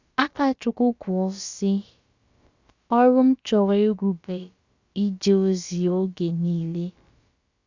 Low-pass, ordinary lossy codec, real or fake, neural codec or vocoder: 7.2 kHz; Opus, 64 kbps; fake; codec, 16 kHz, about 1 kbps, DyCAST, with the encoder's durations